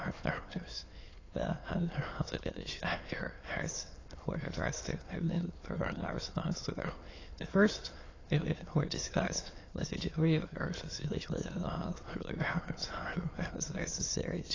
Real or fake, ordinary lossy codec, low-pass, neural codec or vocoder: fake; AAC, 32 kbps; 7.2 kHz; autoencoder, 22.05 kHz, a latent of 192 numbers a frame, VITS, trained on many speakers